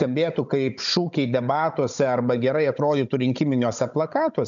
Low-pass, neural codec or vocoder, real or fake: 7.2 kHz; codec, 16 kHz, 16 kbps, FunCodec, trained on Chinese and English, 50 frames a second; fake